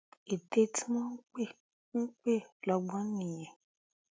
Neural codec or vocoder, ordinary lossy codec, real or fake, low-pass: none; none; real; none